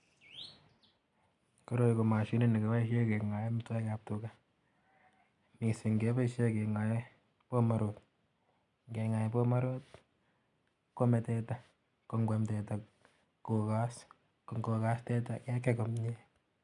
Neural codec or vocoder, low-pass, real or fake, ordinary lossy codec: none; 10.8 kHz; real; none